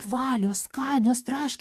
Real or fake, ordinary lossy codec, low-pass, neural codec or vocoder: fake; AAC, 96 kbps; 14.4 kHz; codec, 44.1 kHz, 2.6 kbps, DAC